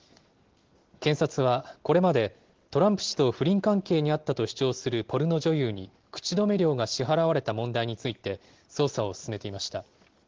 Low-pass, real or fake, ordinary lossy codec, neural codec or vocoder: 7.2 kHz; real; Opus, 16 kbps; none